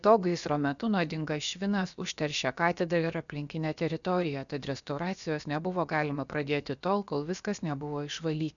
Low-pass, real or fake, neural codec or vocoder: 7.2 kHz; fake; codec, 16 kHz, about 1 kbps, DyCAST, with the encoder's durations